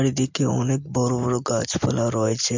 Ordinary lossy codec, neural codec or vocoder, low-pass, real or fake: MP3, 48 kbps; none; 7.2 kHz; real